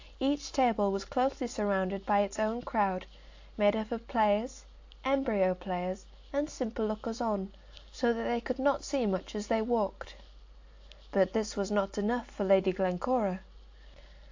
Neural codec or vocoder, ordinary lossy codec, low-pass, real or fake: none; AAC, 48 kbps; 7.2 kHz; real